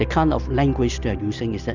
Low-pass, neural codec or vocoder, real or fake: 7.2 kHz; none; real